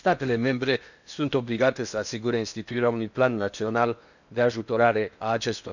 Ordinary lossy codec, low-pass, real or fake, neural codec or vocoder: none; 7.2 kHz; fake; codec, 16 kHz in and 24 kHz out, 0.8 kbps, FocalCodec, streaming, 65536 codes